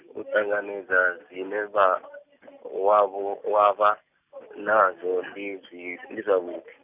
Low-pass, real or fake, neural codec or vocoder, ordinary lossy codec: 3.6 kHz; real; none; none